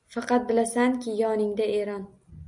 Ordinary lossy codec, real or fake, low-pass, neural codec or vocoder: MP3, 96 kbps; real; 10.8 kHz; none